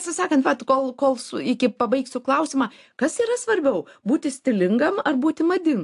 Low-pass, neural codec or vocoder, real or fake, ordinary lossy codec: 10.8 kHz; none; real; AAC, 64 kbps